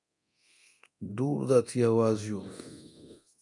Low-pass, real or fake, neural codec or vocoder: 10.8 kHz; fake; codec, 24 kHz, 0.9 kbps, DualCodec